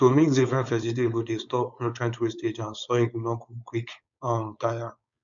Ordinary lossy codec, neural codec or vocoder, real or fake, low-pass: none; codec, 16 kHz, 4.8 kbps, FACodec; fake; 7.2 kHz